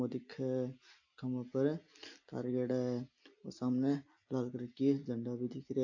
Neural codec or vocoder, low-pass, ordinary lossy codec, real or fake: none; 7.2 kHz; AAC, 48 kbps; real